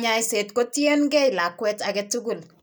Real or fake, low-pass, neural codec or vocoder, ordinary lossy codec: real; none; none; none